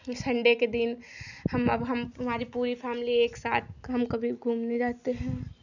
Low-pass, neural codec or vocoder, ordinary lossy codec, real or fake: 7.2 kHz; none; none; real